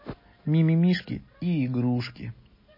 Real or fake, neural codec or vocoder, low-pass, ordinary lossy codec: real; none; 5.4 kHz; MP3, 24 kbps